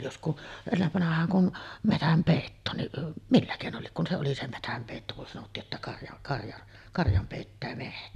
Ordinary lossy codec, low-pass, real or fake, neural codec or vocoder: none; 14.4 kHz; real; none